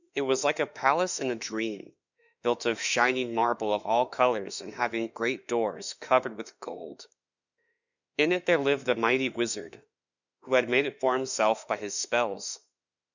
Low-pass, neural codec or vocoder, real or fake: 7.2 kHz; autoencoder, 48 kHz, 32 numbers a frame, DAC-VAE, trained on Japanese speech; fake